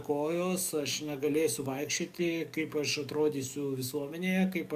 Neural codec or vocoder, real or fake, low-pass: codec, 44.1 kHz, 7.8 kbps, DAC; fake; 14.4 kHz